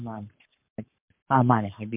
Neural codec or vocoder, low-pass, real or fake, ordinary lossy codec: codec, 44.1 kHz, 7.8 kbps, Pupu-Codec; 3.6 kHz; fake; MP3, 32 kbps